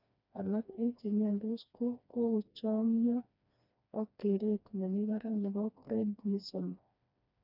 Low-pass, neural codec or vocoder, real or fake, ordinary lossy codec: 5.4 kHz; codec, 16 kHz, 2 kbps, FreqCodec, smaller model; fake; none